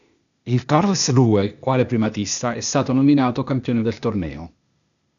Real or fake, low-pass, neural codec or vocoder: fake; 7.2 kHz; codec, 16 kHz, 0.8 kbps, ZipCodec